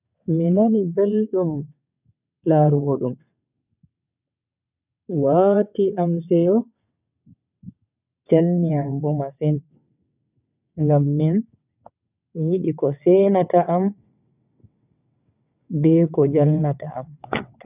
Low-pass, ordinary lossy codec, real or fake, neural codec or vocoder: 3.6 kHz; none; fake; vocoder, 22.05 kHz, 80 mel bands, WaveNeXt